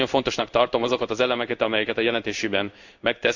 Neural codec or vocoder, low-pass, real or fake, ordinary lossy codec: codec, 16 kHz in and 24 kHz out, 1 kbps, XY-Tokenizer; 7.2 kHz; fake; none